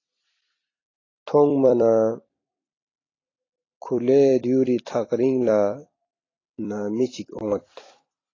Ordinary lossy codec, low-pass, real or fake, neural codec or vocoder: AAC, 32 kbps; 7.2 kHz; fake; vocoder, 44.1 kHz, 128 mel bands every 256 samples, BigVGAN v2